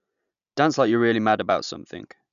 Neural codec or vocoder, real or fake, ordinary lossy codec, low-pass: none; real; none; 7.2 kHz